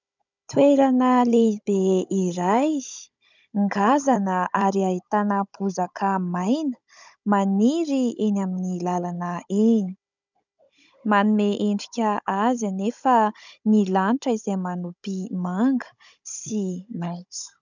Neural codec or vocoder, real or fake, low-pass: codec, 16 kHz, 16 kbps, FunCodec, trained on Chinese and English, 50 frames a second; fake; 7.2 kHz